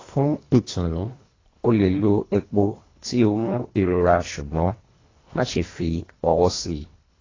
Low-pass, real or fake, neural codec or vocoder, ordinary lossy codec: 7.2 kHz; fake; codec, 24 kHz, 1.5 kbps, HILCodec; AAC, 32 kbps